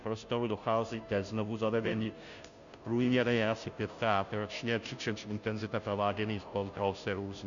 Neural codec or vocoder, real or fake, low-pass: codec, 16 kHz, 0.5 kbps, FunCodec, trained on Chinese and English, 25 frames a second; fake; 7.2 kHz